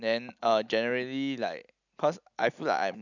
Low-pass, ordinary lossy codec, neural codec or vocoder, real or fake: 7.2 kHz; none; none; real